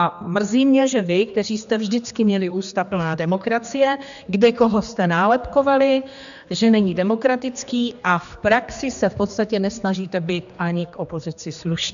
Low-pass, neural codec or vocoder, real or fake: 7.2 kHz; codec, 16 kHz, 2 kbps, X-Codec, HuBERT features, trained on general audio; fake